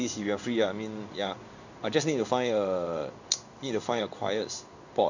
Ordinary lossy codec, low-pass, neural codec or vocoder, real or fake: none; 7.2 kHz; codec, 16 kHz in and 24 kHz out, 1 kbps, XY-Tokenizer; fake